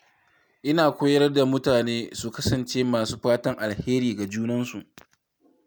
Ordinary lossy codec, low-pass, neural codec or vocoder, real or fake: none; none; none; real